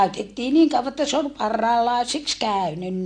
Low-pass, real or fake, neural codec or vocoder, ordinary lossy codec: 9.9 kHz; real; none; AAC, 48 kbps